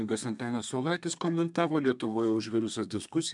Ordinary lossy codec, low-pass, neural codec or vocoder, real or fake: MP3, 96 kbps; 10.8 kHz; codec, 32 kHz, 1.9 kbps, SNAC; fake